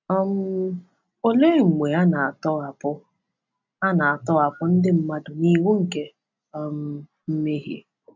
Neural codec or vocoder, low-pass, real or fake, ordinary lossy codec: none; 7.2 kHz; real; MP3, 64 kbps